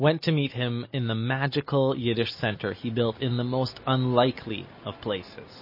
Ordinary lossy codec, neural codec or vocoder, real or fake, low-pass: MP3, 24 kbps; none; real; 5.4 kHz